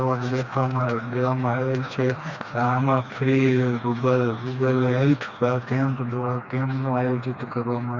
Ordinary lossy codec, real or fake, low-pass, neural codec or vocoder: Opus, 64 kbps; fake; 7.2 kHz; codec, 16 kHz, 2 kbps, FreqCodec, smaller model